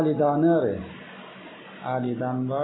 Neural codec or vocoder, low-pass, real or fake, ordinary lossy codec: none; 7.2 kHz; real; AAC, 16 kbps